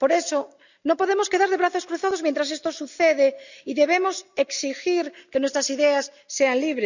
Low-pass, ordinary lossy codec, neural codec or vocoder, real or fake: 7.2 kHz; none; none; real